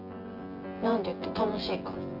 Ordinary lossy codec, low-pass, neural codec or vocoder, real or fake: Opus, 32 kbps; 5.4 kHz; vocoder, 24 kHz, 100 mel bands, Vocos; fake